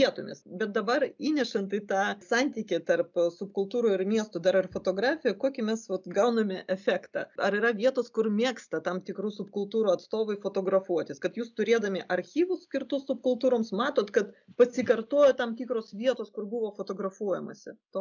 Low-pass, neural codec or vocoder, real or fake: 7.2 kHz; none; real